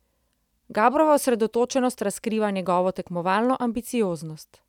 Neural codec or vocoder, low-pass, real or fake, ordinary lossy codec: none; 19.8 kHz; real; none